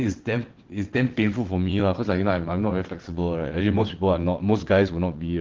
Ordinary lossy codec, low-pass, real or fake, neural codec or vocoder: Opus, 16 kbps; 7.2 kHz; fake; vocoder, 22.05 kHz, 80 mel bands, WaveNeXt